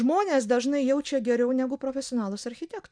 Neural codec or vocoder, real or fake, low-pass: none; real; 9.9 kHz